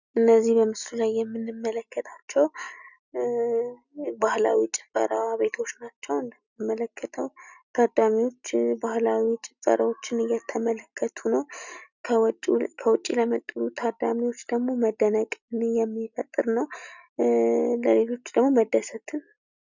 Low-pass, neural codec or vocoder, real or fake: 7.2 kHz; none; real